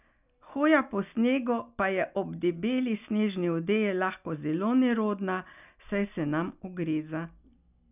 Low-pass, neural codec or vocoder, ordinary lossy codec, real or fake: 3.6 kHz; none; none; real